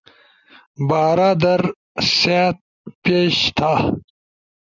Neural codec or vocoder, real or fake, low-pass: none; real; 7.2 kHz